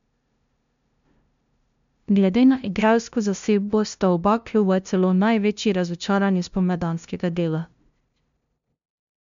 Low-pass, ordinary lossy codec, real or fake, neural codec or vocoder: 7.2 kHz; MP3, 64 kbps; fake; codec, 16 kHz, 0.5 kbps, FunCodec, trained on LibriTTS, 25 frames a second